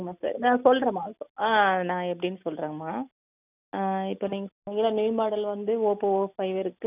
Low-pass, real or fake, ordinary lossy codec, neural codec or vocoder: 3.6 kHz; real; none; none